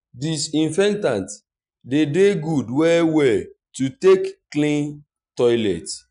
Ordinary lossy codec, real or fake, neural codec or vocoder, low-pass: none; real; none; 9.9 kHz